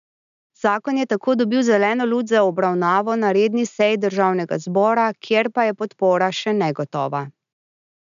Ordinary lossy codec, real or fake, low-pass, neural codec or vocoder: none; real; 7.2 kHz; none